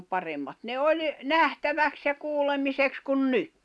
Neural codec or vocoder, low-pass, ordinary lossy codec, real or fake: none; none; none; real